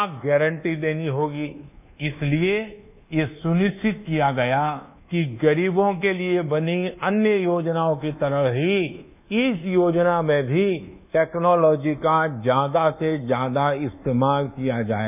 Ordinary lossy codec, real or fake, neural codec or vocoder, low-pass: none; fake; codec, 24 kHz, 1.2 kbps, DualCodec; 3.6 kHz